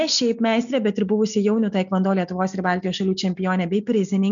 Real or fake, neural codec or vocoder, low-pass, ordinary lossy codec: real; none; 7.2 kHz; MP3, 96 kbps